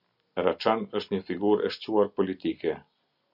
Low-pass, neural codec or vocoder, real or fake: 5.4 kHz; none; real